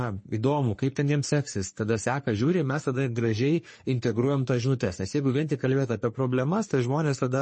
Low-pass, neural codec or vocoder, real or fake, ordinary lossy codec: 10.8 kHz; codec, 44.1 kHz, 3.4 kbps, Pupu-Codec; fake; MP3, 32 kbps